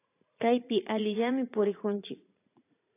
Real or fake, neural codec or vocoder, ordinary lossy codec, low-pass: fake; vocoder, 44.1 kHz, 80 mel bands, Vocos; AAC, 24 kbps; 3.6 kHz